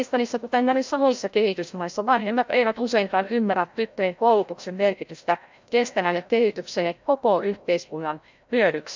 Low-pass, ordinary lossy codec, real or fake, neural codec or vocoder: 7.2 kHz; MP3, 64 kbps; fake; codec, 16 kHz, 0.5 kbps, FreqCodec, larger model